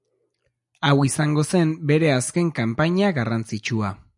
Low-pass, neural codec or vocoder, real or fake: 10.8 kHz; none; real